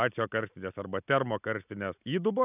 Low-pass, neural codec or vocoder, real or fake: 3.6 kHz; none; real